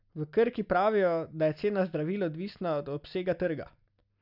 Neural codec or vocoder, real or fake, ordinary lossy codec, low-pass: none; real; none; 5.4 kHz